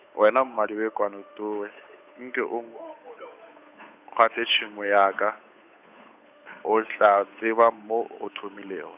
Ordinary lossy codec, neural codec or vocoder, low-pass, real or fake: none; codec, 16 kHz, 8 kbps, FunCodec, trained on Chinese and English, 25 frames a second; 3.6 kHz; fake